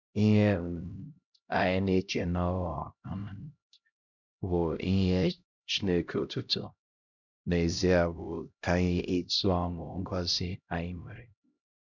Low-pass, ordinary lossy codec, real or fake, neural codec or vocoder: 7.2 kHz; none; fake; codec, 16 kHz, 0.5 kbps, X-Codec, HuBERT features, trained on LibriSpeech